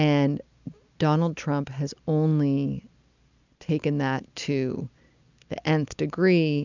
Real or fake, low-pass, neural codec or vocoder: real; 7.2 kHz; none